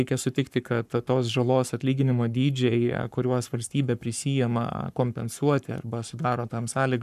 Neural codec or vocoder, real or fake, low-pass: codec, 44.1 kHz, 7.8 kbps, Pupu-Codec; fake; 14.4 kHz